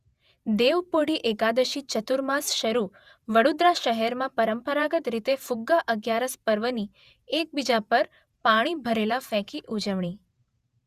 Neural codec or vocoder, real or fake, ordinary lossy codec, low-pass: vocoder, 48 kHz, 128 mel bands, Vocos; fake; Opus, 64 kbps; 14.4 kHz